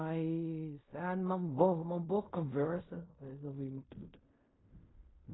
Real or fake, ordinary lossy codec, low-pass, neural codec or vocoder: fake; AAC, 16 kbps; 7.2 kHz; codec, 16 kHz in and 24 kHz out, 0.4 kbps, LongCat-Audio-Codec, fine tuned four codebook decoder